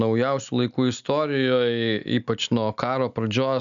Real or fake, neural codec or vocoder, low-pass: real; none; 7.2 kHz